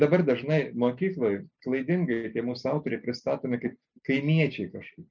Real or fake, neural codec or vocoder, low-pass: real; none; 7.2 kHz